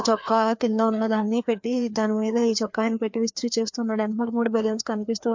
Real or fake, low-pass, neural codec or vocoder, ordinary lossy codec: fake; 7.2 kHz; codec, 16 kHz, 2 kbps, FreqCodec, larger model; MP3, 48 kbps